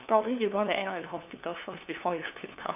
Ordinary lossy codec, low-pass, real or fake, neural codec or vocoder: none; 3.6 kHz; fake; codec, 16 kHz, 2 kbps, FunCodec, trained on LibriTTS, 25 frames a second